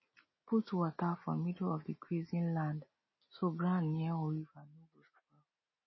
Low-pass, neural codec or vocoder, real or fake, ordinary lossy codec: 7.2 kHz; none; real; MP3, 24 kbps